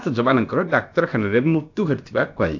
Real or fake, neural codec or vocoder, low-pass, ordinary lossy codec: fake; codec, 16 kHz, about 1 kbps, DyCAST, with the encoder's durations; 7.2 kHz; AAC, 48 kbps